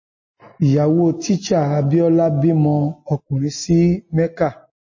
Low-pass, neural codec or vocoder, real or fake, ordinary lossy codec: 7.2 kHz; none; real; MP3, 32 kbps